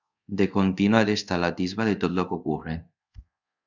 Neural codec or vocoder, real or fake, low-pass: codec, 24 kHz, 0.5 kbps, DualCodec; fake; 7.2 kHz